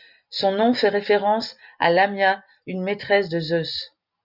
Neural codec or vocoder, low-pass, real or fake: none; 5.4 kHz; real